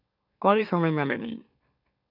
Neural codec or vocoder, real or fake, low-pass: autoencoder, 44.1 kHz, a latent of 192 numbers a frame, MeloTTS; fake; 5.4 kHz